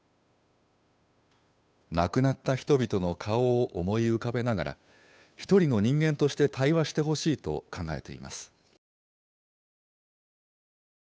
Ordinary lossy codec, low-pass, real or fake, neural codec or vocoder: none; none; fake; codec, 16 kHz, 2 kbps, FunCodec, trained on Chinese and English, 25 frames a second